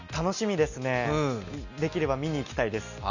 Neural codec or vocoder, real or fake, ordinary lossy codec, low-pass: none; real; none; 7.2 kHz